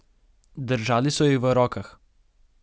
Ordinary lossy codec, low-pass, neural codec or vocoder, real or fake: none; none; none; real